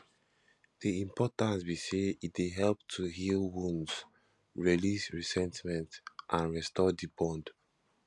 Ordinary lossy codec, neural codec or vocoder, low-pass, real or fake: none; none; 10.8 kHz; real